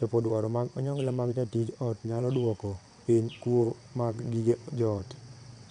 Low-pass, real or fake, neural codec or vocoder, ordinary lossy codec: 9.9 kHz; fake; vocoder, 22.05 kHz, 80 mel bands, WaveNeXt; none